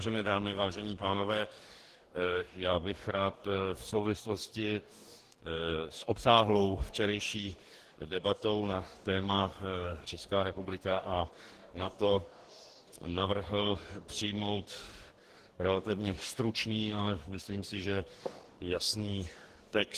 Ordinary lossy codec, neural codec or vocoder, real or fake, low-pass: Opus, 16 kbps; codec, 44.1 kHz, 2.6 kbps, DAC; fake; 14.4 kHz